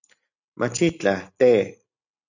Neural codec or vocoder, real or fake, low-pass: none; real; 7.2 kHz